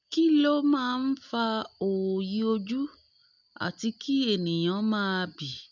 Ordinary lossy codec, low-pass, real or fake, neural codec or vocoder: none; 7.2 kHz; real; none